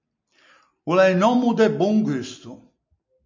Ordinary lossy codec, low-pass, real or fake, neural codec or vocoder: MP3, 64 kbps; 7.2 kHz; real; none